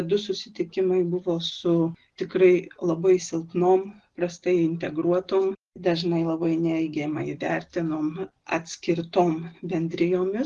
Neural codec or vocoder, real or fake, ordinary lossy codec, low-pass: none; real; Opus, 16 kbps; 10.8 kHz